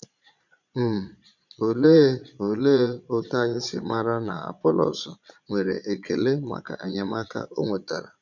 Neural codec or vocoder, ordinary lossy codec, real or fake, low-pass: vocoder, 22.05 kHz, 80 mel bands, Vocos; none; fake; 7.2 kHz